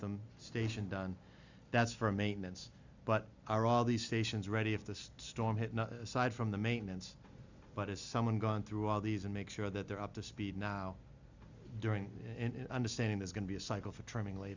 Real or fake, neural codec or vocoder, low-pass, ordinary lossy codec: real; none; 7.2 kHz; Opus, 64 kbps